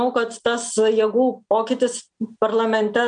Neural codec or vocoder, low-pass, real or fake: none; 9.9 kHz; real